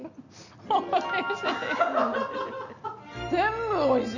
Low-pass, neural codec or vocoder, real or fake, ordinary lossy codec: 7.2 kHz; none; real; none